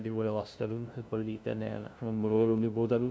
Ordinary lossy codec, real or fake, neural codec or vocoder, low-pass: none; fake; codec, 16 kHz, 0.5 kbps, FunCodec, trained on LibriTTS, 25 frames a second; none